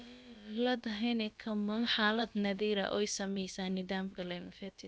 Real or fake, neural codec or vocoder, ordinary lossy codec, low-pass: fake; codec, 16 kHz, about 1 kbps, DyCAST, with the encoder's durations; none; none